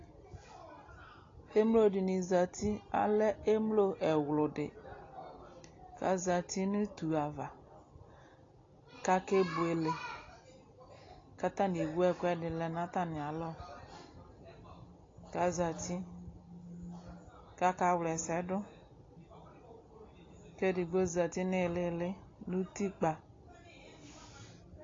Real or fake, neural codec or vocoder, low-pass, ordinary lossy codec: real; none; 7.2 kHz; AAC, 32 kbps